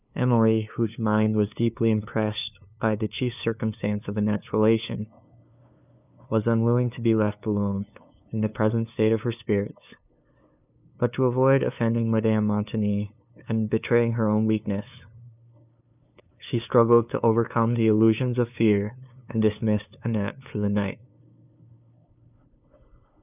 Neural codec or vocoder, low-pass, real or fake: codec, 16 kHz, 2 kbps, FunCodec, trained on LibriTTS, 25 frames a second; 3.6 kHz; fake